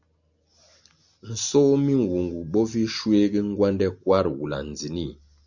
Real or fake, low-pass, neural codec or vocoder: real; 7.2 kHz; none